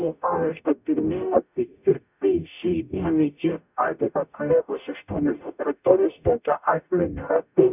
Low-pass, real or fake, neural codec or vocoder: 3.6 kHz; fake; codec, 44.1 kHz, 0.9 kbps, DAC